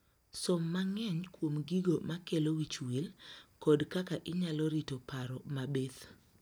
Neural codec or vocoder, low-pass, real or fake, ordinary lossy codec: none; none; real; none